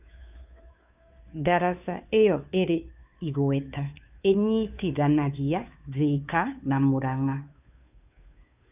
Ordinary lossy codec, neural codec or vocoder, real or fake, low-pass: none; codec, 16 kHz, 2 kbps, FunCodec, trained on Chinese and English, 25 frames a second; fake; 3.6 kHz